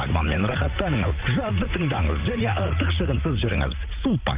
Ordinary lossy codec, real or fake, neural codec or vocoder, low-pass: Opus, 32 kbps; fake; vocoder, 44.1 kHz, 80 mel bands, Vocos; 3.6 kHz